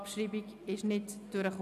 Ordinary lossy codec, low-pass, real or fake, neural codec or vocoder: none; 14.4 kHz; real; none